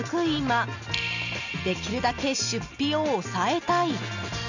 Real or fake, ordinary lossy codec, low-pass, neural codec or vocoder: real; none; 7.2 kHz; none